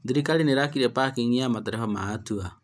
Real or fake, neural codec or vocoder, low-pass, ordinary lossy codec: real; none; none; none